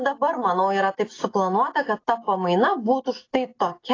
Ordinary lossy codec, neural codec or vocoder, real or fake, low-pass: AAC, 32 kbps; none; real; 7.2 kHz